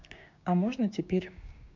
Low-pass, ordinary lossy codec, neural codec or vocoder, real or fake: 7.2 kHz; MP3, 64 kbps; codec, 16 kHz, 6 kbps, DAC; fake